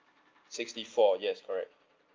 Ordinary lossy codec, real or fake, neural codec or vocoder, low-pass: Opus, 32 kbps; real; none; 7.2 kHz